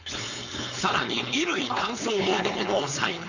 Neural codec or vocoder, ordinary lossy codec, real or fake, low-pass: codec, 16 kHz, 4.8 kbps, FACodec; none; fake; 7.2 kHz